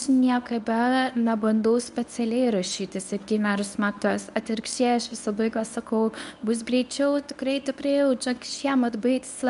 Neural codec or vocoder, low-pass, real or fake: codec, 24 kHz, 0.9 kbps, WavTokenizer, medium speech release version 1; 10.8 kHz; fake